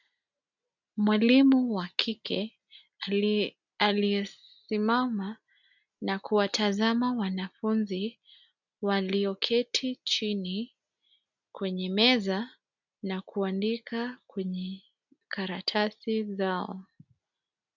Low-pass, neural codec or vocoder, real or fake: 7.2 kHz; none; real